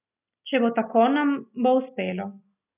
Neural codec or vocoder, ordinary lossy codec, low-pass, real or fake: none; none; 3.6 kHz; real